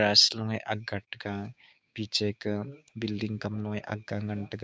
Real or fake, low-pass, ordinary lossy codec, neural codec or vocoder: fake; none; none; codec, 16 kHz, 6 kbps, DAC